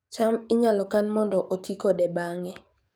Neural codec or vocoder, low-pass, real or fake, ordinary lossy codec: codec, 44.1 kHz, 7.8 kbps, DAC; none; fake; none